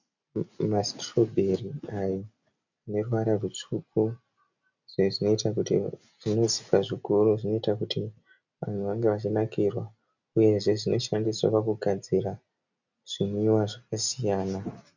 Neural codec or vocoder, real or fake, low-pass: none; real; 7.2 kHz